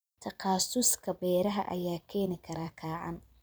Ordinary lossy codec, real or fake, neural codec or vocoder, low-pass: none; fake; vocoder, 44.1 kHz, 128 mel bands every 256 samples, BigVGAN v2; none